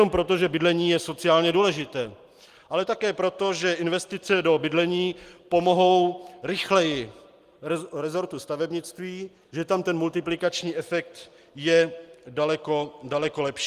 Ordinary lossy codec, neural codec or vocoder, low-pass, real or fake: Opus, 24 kbps; none; 14.4 kHz; real